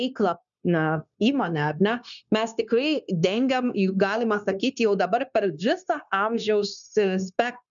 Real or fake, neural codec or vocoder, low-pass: fake; codec, 16 kHz, 0.9 kbps, LongCat-Audio-Codec; 7.2 kHz